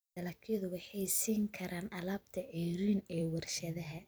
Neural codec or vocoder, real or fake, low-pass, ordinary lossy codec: vocoder, 44.1 kHz, 128 mel bands every 256 samples, BigVGAN v2; fake; none; none